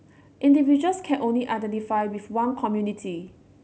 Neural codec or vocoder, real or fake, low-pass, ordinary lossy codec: none; real; none; none